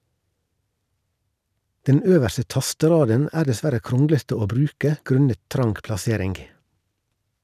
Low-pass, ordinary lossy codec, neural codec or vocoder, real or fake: 14.4 kHz; none; none; real